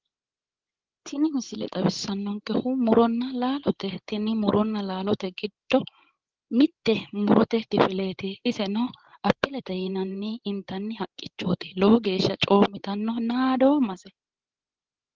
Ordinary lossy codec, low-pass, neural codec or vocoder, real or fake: Opus, 16 kbps; 7.2 kHz; codec, 16 kHz, 16 kbps, FreqCodec, larger model; fake